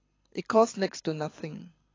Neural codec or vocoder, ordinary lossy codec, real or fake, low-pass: codec, 24 kHz, 6 kbps, HILCodec; AAC, 32 kbps; fake; 7.2 kHz